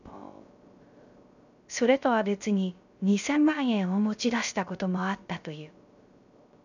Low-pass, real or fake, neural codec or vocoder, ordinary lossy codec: 7.2 kHz; fake; codec, 16 kHz, 0.3 kbps, FocalCodec; none